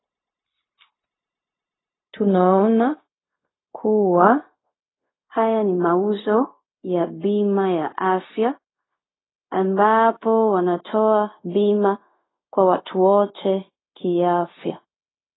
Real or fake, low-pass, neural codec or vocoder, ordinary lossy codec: fake; 7.2 kHz; codec, 16 kHz, 0.4 kbps, LongCat-Audio-Codec; AAC, 16 kbps